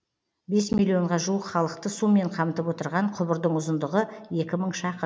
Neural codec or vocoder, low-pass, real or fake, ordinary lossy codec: none; none; real; none